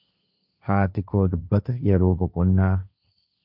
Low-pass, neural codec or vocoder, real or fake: 5.4 kHz; codec, 16 kHz, 1.1 kbps, Voila-Tokenizer; fake